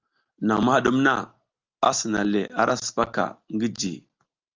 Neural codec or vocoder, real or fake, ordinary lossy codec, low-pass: none; real; Opus, 32 kbps; 7.2 kHz